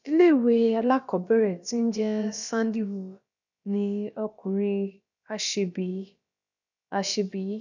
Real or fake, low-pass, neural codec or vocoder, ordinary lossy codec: fake; 7.2 kHz; codec, 16 kHz, about 1 kbps, DyCAST, with the encoder's durations; none